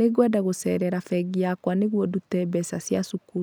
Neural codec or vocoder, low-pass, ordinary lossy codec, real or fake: none; none; none; real